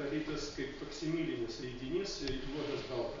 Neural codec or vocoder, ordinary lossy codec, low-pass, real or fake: none; MP3, 48 kbps; 7.2 kHz; real